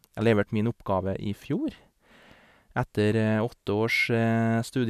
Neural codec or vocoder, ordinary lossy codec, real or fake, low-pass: none; none; real; 14.4 kHz